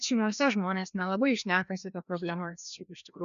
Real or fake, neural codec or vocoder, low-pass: fake; codec, 16 kHz, 1 kbps, FreqCodec, larger model; 7.2 kHz